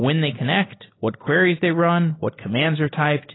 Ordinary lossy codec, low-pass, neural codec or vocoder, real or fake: AAC, 16 kbps; 7.2 kHz; none; real